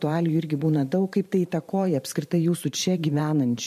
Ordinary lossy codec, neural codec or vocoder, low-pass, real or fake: MP3, 64 kbps; vocoder, 44.1 kHz, 128 mel bands every 512 samples, BigVGAN v2; 14.4 kHz; fake